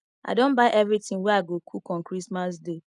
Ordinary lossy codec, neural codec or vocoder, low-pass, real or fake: none; none; 10.8 kHz; real